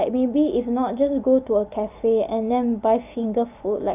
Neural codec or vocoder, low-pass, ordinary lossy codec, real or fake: none; 3.6 kHz; none; real